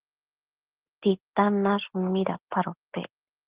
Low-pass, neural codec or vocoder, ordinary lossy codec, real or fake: 3.6 kHz; none; Opus, 16 kbps; real